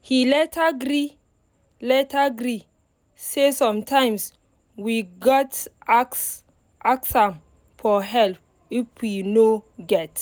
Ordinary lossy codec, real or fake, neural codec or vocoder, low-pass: none; real; none; none